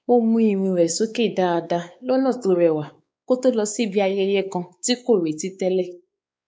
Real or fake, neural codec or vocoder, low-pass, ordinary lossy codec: fake; codec, 16 kHz, 4 kbps, X-Codec, WavLM features, trained on Multilingual LibriSpeech; none; none